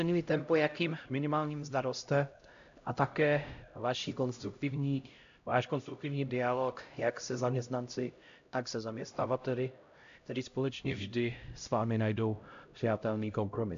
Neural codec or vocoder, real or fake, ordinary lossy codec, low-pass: codec, 16 kHz, 0.5 kbps, X-Codec, HuBERT features, trained on LibriSpeech; fake; AAC, 64 kbps; 7.2 kHz